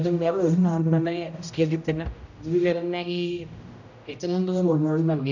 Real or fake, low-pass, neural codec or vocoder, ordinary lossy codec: fake; 7.2 kHz; codec, 16 kHz, 0.5 kbps, X-Codec, HuBERT features, trained on general audio; none